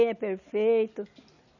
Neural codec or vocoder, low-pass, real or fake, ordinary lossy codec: none; 7.2 kHz; real; none